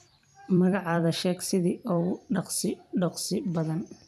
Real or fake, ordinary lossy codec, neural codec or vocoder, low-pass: fake; none; vocoder, 44.1 kHz, 128 mel bands every 256 samples, BigVGAN v2; 14.4 kHz